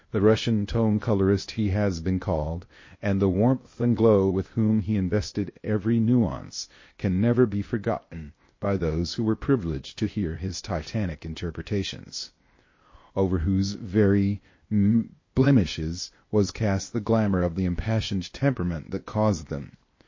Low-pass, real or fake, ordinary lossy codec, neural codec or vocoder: 7.2 kHz; fake; MP3, 32 kbps; codec, 16 kHz, 0.8 kbps, ZipCodec